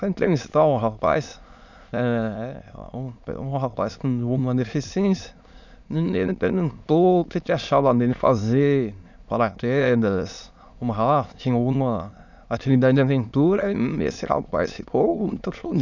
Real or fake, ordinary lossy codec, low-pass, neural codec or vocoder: fake; none; 7.2 kHz; autoencoder, 22.05 kHz, a latent of 192 numbers a frame, VITS, trained on many speakers